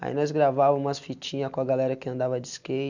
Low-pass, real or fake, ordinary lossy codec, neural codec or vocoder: 7.2 kHz; real; none; none